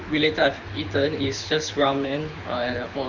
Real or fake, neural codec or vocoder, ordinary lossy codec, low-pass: fake; codec, 24 kHz, 6 kbps, HILCodec; none; 7.2 kHz